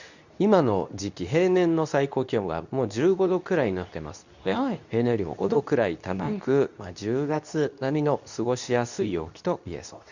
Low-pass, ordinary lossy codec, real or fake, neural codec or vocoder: 7.2 kHz; none; fake; codec, 24 kHz, 0.9 kbps, WavTokenizer, medium speech release version 2